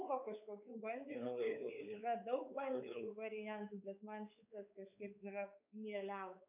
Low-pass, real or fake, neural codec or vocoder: 3.6 kHz; fake; codec, 16 kHz, 4 kbps, X-Codec, WavLM features, trained on Multilingual LibriSpeech